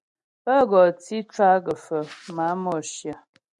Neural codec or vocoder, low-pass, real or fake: none; 10.8 kHz; real